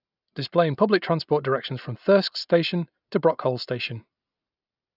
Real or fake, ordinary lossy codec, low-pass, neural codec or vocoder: real; none; 5.4 kHz; none